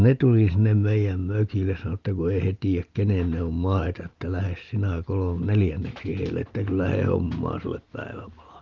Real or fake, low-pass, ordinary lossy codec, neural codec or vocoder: real; 7.2 kHz; Opus, 32 kbps; none